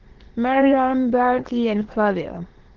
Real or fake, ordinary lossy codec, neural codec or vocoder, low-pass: fake; Opus, 16 kbps; autoencoder, 22.05 kHz, a latent of 192 numbers a frame, VITS, trained on many speakers; 7.2 kHz